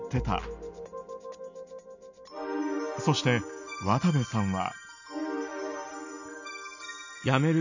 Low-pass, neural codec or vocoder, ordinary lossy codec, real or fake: 7.2 kHz; none; none; real